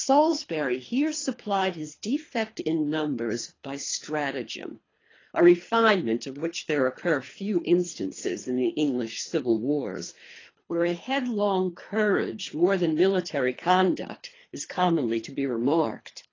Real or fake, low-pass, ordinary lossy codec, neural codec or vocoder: fake; 7.2 kHz; AAC, 32 kbps; codec, 24 kHz, 3 kbps, HILCodec